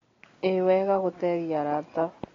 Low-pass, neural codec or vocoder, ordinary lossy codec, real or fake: 7.2 kHz; none; AAC, 32 kbps; real